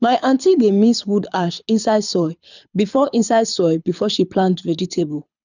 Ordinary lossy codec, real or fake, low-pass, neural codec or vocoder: none; fake; 7.2 kHz; codec, 24 kHz, 6 kbps, HILCodec